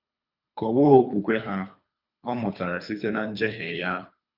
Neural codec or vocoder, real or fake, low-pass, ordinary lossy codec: codec, 24 kHz, 3 kbps, HILCodec; fake; 5.4 kHz; none